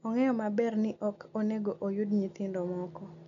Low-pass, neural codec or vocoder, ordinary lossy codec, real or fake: 7.2 kHz; none; none; real